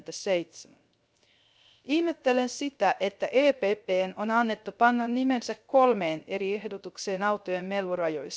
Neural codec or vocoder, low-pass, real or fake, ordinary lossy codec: codec, 16 kHz, 0.3 kbps, FocalCodec; none; fake; none